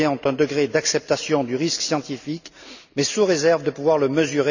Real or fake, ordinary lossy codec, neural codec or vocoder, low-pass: real; none; none; 7.2 kHz